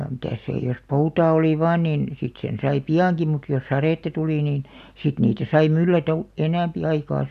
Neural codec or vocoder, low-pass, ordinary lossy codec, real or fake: none; 14.4 kHz; none; real